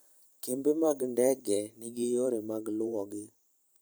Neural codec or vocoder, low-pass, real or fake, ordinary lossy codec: vocoder, 44.1 kHz, 128 mel bands every 512 samples, BigVGAN v2; none; fake; none